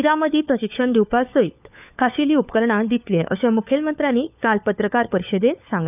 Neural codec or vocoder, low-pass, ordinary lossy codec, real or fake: codec, 16 kHz, 4 kbps, FunCodec, trained on LibriTTS, 50 frames a second; 3.6 kHz; none; fake